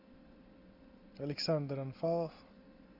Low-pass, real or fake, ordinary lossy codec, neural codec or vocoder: 5.4 kHz; real; MP3, 48 kbps; none